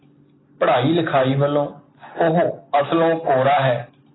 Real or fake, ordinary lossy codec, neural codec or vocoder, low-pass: real; AAC, 16 kbps; none; 7.2 kHz